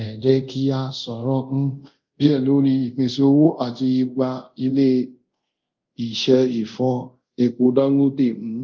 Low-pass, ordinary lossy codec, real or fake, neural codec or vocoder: 7.2 kHz; Opus, 32 kbps; fake; codec, 24 kHz, 0.5 kbps, DualCodec